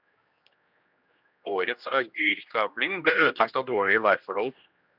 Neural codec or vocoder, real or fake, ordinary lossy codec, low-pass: codec, 16 kHz, 1 kbps, X-Codec, HuBERT features, trained on general audio; fake; Opus, 64 kbps; 5.4 kHz